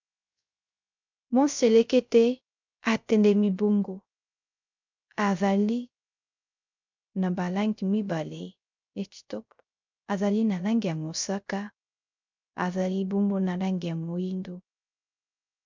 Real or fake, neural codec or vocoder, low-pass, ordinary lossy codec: fake; codec, 16 kHz, 0.3 kbps, FocalCodec; 7.2 kHz; MP3, 64 kbps